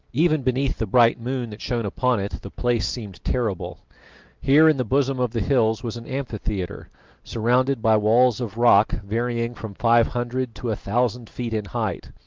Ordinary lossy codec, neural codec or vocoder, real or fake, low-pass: Opus, 24 kbps; none; real; 7.2 kHz